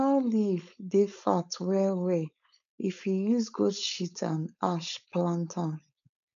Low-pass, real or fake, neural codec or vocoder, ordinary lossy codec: 7.2 kHz; fake; codec, 16 kHz, 4.8 kbps, FACodec; AAC, 96 kbps